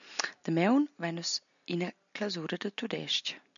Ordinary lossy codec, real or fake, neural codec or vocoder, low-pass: MP3, 96 kbps; real; none; 7.2 kHz